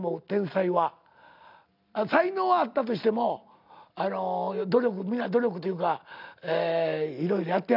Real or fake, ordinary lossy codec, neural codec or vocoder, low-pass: real; none; none; 5.4 kHz